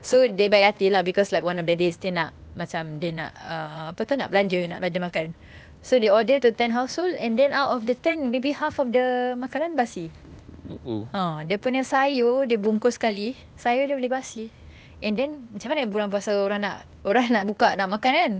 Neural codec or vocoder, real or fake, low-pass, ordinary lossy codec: codec, 16 kHz, 0.8 kbps, ZipCodec; fake; none; none